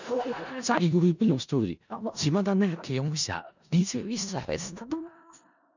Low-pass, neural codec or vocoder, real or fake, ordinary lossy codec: 7.2 kHz; codec, 16 kHz in and 24 kHz out, 0.4 kbps, LongCat-Audio-Codec, four codebook decoder; fake; none